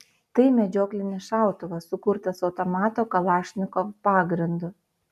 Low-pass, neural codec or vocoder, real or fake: 14.4 kHz; none; real